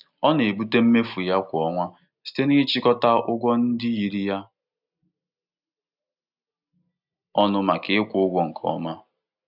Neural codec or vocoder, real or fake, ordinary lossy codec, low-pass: none; real; none; 5.4 kHz